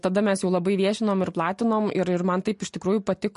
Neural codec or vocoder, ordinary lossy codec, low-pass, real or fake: none; MP3, 48 kbps; 10.8 kHz; real